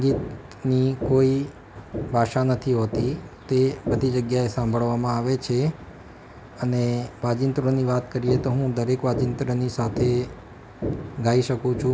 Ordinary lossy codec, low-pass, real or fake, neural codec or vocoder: none; none; real; none